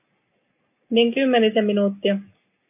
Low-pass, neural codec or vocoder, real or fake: 3.6 kHz; none; real